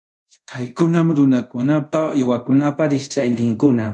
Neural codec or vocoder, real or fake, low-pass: codec, 24 kHz, 0.5 kbps, DualCodec; fake; 10.8 kHz